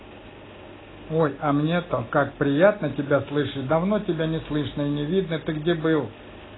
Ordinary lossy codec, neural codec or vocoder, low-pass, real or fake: AAC, 16 kbps; none; 7.2 kHz; real